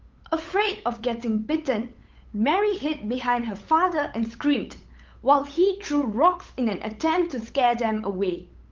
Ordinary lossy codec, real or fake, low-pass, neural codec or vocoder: Opus, 24 kbps; fake; 7.2 kHz; codec, 16 kHz, 8 kbps, FunCodec, trained on LibriTTS, 25 frames a second